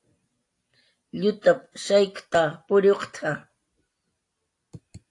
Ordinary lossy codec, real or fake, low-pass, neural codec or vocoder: AAC, 48 kbps; real; 10.8 kHz; none